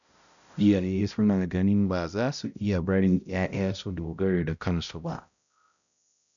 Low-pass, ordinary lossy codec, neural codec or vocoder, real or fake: 7.2 kHz; none; codec, 16 kHz, 0.5 kbps, X-Codec, HuBERT features, trained on balanced general audio; fake